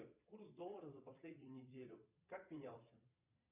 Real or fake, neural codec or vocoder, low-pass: fake; vocoder, 22.05 kHz, 80 mel bands, Vocos; 3.6 kHz